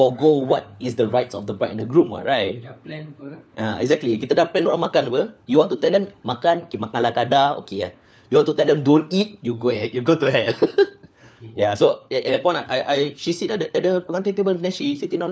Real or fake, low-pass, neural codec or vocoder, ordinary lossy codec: fake; none; codec, 16 kHz, 4 kbps, FunCodec, trained on LibriTTS, 50 frames a second; none